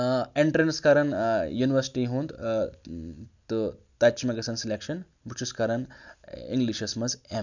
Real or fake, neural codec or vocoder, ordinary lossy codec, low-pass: real; none; none; 7.2 kHz